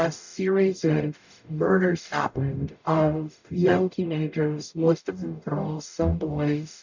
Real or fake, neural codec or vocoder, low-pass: fake; codec, 44.1 kHz, 0.9 kbps, DAC; 7.2 kHz